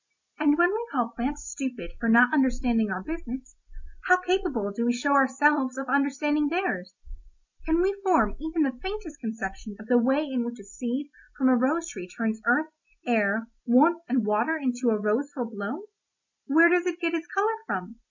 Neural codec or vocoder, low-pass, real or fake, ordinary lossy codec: none; 7.2 kHz; real; MP3, 48 kbps